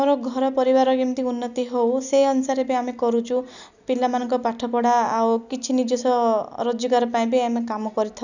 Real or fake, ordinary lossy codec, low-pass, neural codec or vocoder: real; none; 7.2 kHz; none